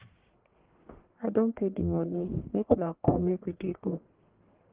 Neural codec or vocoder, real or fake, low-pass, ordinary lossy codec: codec, 44.1 kHz, 1.7 kbps, Pupu-Codec; fake; 3.6 kHz; Opus, 16 kbps